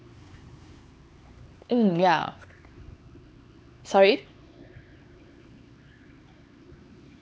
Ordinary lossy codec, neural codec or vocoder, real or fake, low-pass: none; codec, 16 kHz, 4 kbps, X-Codec, HuBERT features, trained on LibriSpeech; fake; none